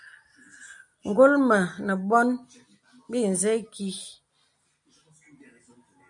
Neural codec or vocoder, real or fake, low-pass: none; real; 10.8 kHz